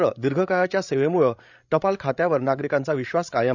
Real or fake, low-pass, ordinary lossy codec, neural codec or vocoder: fake; 7.2 kHz; none; codec, 16 kHz, 16 kbps, FreqCodec, larger model